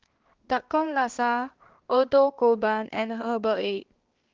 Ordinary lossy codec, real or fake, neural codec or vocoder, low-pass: Opus, 16 kbps; fake; codec, 16 kHz, 2 kbps, X-Codec, HuBERT features, trained on balanced general audio; 7.2 kHz